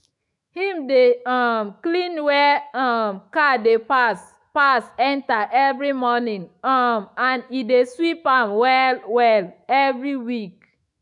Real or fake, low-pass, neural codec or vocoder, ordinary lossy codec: fake; 10.8 kHz; autoencoder, 48 kHz, 128 numbers a frame, DAC-VAE, trained on Japanese speech; none